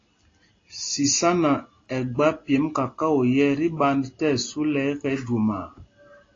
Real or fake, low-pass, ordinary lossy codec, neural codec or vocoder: real; 7.2 kHz; AAC, 32 kbps; none